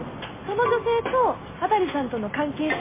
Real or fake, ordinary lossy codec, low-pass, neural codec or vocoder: real; MP3, 16 kbps; 3.6 kHz; none